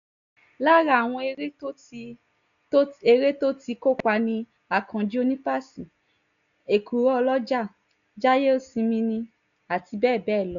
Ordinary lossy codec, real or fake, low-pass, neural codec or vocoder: none; real; 7.2 kHz; none